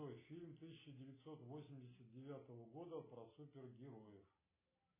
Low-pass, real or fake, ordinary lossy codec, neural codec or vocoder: 3.6 kHz; real; MP3, 16 kbps; none